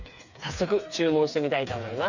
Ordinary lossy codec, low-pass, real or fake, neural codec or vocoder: none; 7.2 kHz; fake; codec, 16 kHz, 4 kbps, FreqCodec, smaller model